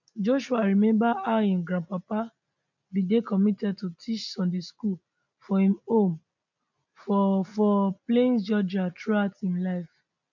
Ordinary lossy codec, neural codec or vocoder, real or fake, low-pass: none; none; real; 7.2 kHz